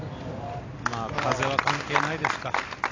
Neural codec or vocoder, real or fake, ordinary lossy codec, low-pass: none; real; AAC, 32 kbps; 7.2 kHz